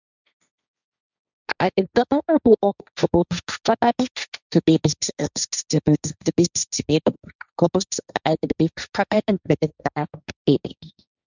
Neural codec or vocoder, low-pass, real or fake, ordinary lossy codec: codec, 16 kHz, 1.1 kbps, Voila-Tokenizer; 7.2 kHz; fake; none